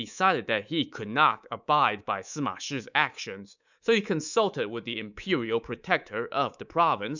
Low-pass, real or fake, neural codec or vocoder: 7.2 kHz; fake; codec, 24 kHz, 3.1 kbps, DualCodec